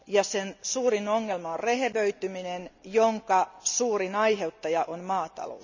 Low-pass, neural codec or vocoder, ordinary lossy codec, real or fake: 7.2 kHz; none; none; real